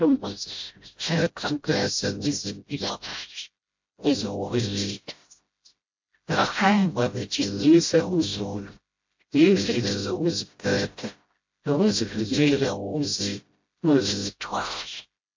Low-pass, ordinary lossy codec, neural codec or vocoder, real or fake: 7.2 kHz; MP3, 48 kbps; codec, 16 kHz, 0.5 kbps, FreqCodec, smaller model; fake